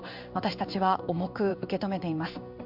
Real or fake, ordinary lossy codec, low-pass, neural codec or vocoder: fake; MP3, 48 kbps; 5.4 kHz; codec, 16 kHz in and 24 kHz out, 1 kbps, XY-Tokenizer